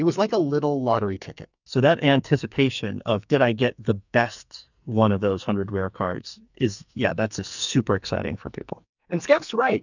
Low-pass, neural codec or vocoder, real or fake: 7.2 kHz; codec, 44.1 kHz, 2.6 kbps, SNAC; fake